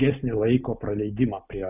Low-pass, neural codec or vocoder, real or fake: 3.6 kHz; none; real